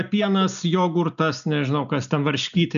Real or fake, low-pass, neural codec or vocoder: real; 7.2 kHz; none